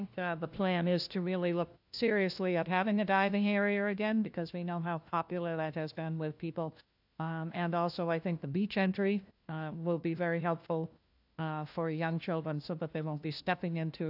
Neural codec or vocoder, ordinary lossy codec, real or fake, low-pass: codec, 16 kHz, 1 kbps, FunCodec, trained on LibriTTS, 50 frames a second; AAC, 48 kbps; fake; 5.4 kHz